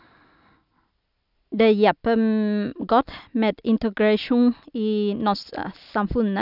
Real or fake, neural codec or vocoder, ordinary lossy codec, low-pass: real; none; none; 5.4 kHz